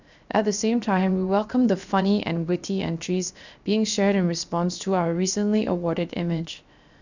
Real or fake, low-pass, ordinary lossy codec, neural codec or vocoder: fake; 7.2 kHz; none; codec, 16 kHz, 0.7 kbps, FocalCodec